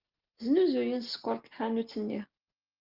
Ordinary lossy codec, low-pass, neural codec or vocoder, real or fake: Opus, 32 kbps; 5.4 kHz; none; real